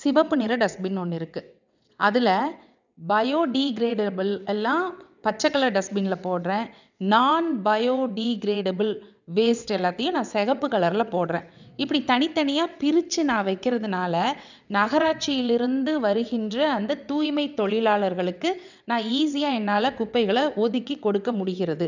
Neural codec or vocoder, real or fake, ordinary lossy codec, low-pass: vocoder, 22.05 kHz, 80 mel bands, WaveNeXt; fake; none; 7.2 kHz